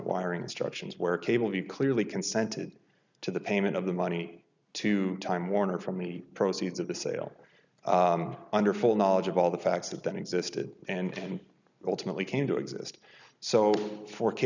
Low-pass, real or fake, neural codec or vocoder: 7.2 kHz; real; none